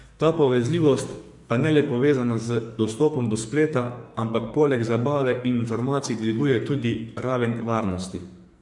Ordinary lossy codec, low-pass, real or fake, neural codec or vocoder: MP3, 64 kbps; 10.8 kHz; fake; codec, 44.1 kHz, 2.6 kbps, SNAC